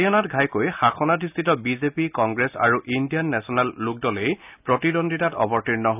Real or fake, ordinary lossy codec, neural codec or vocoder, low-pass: real; none; none; 3.6 kHz